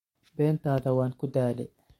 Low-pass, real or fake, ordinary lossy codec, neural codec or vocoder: 19.8 kHz; fake; MP3, 64 kbps; codec, 44.1 kHz, 7.8 kbps, Pupu-Codec